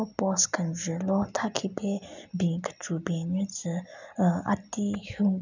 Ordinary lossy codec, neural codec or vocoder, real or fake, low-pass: none; none; real; 7.2 kHz